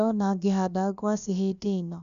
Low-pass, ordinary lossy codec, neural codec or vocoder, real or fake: 7.2 kHz; none; codec, 16 kHz, about 1 kbps, DyCAST, with the encoder's durations; fake